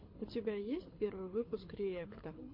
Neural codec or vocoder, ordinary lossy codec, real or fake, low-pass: codec, 24 kHz, 6 kbps, HILCodec; MP3, 32 kbps; fake; 5.4 kHz